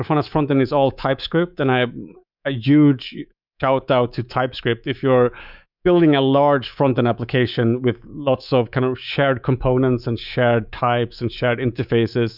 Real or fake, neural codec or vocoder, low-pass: fake; codec, 24 kHz, 3.1 kbps, DualCodec; 5.4 kHz